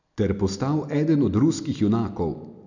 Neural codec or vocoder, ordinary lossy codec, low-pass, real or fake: none; none; 7.2 kHz; real